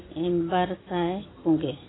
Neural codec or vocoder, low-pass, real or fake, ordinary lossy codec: none; 7.2 kHz; real; AAC, 16 kbps